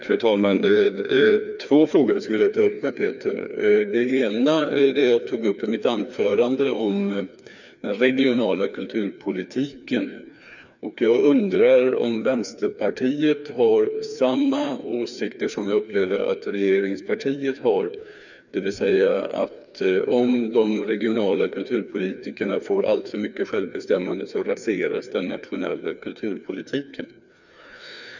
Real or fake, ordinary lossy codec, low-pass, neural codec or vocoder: fake; none; 7.2 kHz; codec, 16 kHz, 2 kbps, FreqCodec, larger model